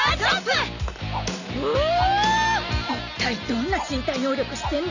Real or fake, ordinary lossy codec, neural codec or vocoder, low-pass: real; none; none; 7.2 kHz